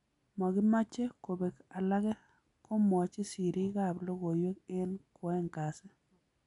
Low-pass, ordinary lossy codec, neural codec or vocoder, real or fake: 10.8 kHz; none; none; real